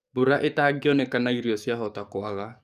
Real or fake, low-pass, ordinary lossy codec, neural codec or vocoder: fake; 14.4 kHz; none; codec, 44.1 kHz, 7.8 kbps, DAC